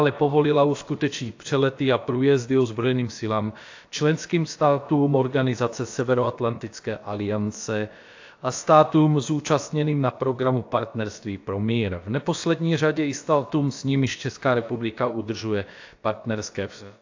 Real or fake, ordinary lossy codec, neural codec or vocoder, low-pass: fake; AAC, 48 kbps; codec, 16 kHz, about 1 kbps, DyCAST, with the encoder's durations; 7.2 kHz